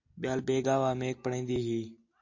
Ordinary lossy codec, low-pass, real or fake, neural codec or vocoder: AAC, 48 kbps; 7.2 kHz; real; none